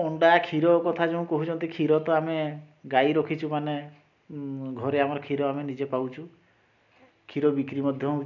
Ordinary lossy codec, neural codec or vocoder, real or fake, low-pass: none; none; real; 7.2 kHz